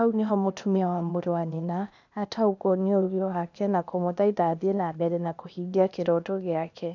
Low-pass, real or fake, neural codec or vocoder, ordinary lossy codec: 7.2 kHz; fake; codec, 16 kHz, 0.8 kbps, ZipCodec; none